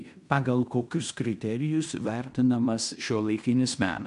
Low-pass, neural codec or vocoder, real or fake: 10.8 kHz; codec, 16 kHz in and 24 kHz out, 0.9 kbps, LongCat-Audio-Codec, fine tuned four codebook decoder; fake